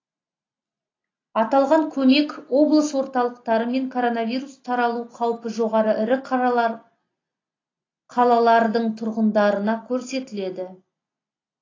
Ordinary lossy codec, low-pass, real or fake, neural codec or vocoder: AAC, 32 kbps; 7.2 kHz; real; none